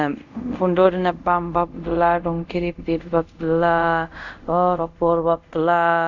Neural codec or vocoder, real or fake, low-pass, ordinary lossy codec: codec, 24 kHz, 0.5 kbps, DualCodec; fake; 7.2 kHz; none